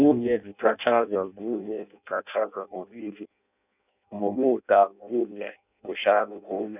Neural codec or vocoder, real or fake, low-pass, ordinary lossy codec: codec, 16 kHz in and 24 kHz out, 0.6 kbps, FireRedTTS-2 codec; fake; 3.6 kHz; none